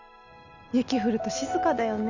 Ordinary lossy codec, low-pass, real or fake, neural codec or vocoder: none; 7.2 kHz; real; none